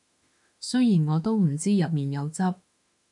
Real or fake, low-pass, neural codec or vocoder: fake; 10.8 kHz; autoencoder, 48 kHz, 32 numbers a frame, DAC-VAE, trained on Japanese speech